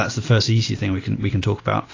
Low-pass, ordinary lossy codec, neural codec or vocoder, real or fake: 7.2 kHz; AAC, 32 kbps; none; real